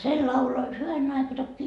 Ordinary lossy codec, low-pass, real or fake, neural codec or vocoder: none; 10.8 kHz; real; none